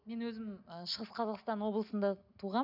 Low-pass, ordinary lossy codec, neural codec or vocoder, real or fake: 5.4 kHz; none; none; real